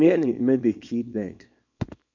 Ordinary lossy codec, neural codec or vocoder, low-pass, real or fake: MP3, 64 kbps; codec, 24 kHz, 0.9 kbps, WavTokenizer, small release; 7.2 kHz; fake